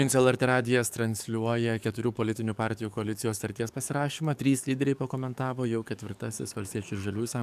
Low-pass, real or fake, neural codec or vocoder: 14.4 kHz; fake; codec, 44.1 kHz, 7.8 kbps, DAC